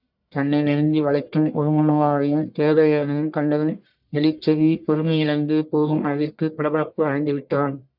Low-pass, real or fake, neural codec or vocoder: 5.4 kHz; fake; codec, 44.1 kHz, 1.7 kbps, Pupu-Codec